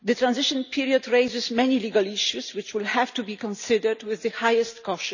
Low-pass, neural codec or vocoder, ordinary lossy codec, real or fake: 7.2 kHz; none; none; real